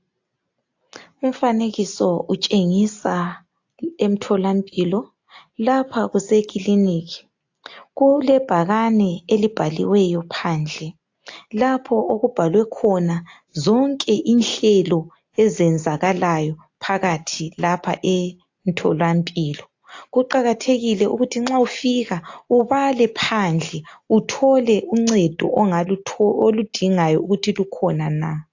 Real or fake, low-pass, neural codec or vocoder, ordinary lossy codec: real; 7.2 kHz; none; AAC, 48 kbps